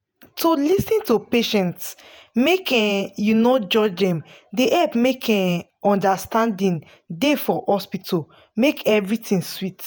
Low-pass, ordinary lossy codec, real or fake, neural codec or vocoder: none; none; fake; vocoder, 48 kHz, 128 mel bands, Vocos